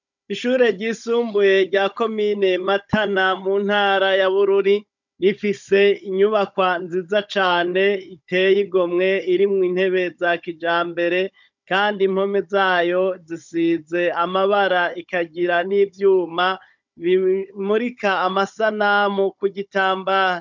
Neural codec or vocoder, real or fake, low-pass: codec, 16 kHz, 16 kbps, FunCodec, trained on Chinese and English, 50 frames a second; fake; 7.2 kHz